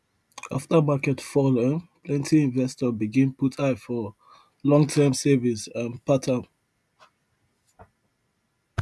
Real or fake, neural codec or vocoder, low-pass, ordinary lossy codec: real; none; none; none